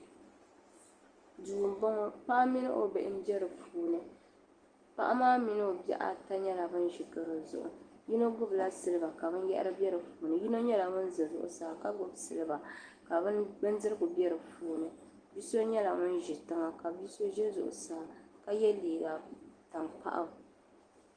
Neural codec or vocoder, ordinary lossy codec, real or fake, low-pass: none; Opus, 24 kbps; real; 9.9 kHz